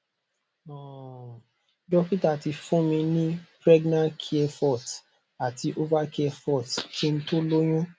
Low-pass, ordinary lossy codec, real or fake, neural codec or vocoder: none; none; real; none